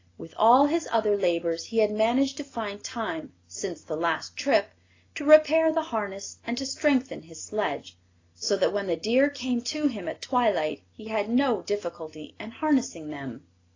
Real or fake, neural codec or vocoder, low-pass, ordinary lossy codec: real; none; 7.2 kHz; AAC, 32 kbps